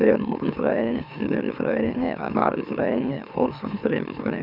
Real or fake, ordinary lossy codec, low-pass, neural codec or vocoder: fake; none; 5.4 kHz; autoencoder, 44.1 kHz, a latent of 192 numbers a frame, MeloTTS